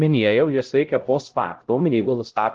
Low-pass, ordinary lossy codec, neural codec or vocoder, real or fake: 7.2 kHz; Opus, 16 kbps; codec, 16 kHz, 0.5 kbps, X-Codec, HuBERT features, trained on LibriSpeech; fake